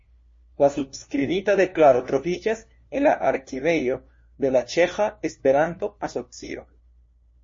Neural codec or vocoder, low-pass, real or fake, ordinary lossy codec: codec, 16 kHz, 1 kbps, FunCodec, trained on LibriTTS, 50 frames a second; 7.2 kHz; fake; MP3, 32 kbps